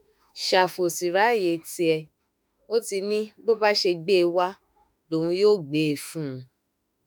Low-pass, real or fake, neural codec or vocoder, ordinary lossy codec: none; fake; autoencoder, 48 kHz, 32 numbers a frame, DAC-VAE, trained on Japanese speech; none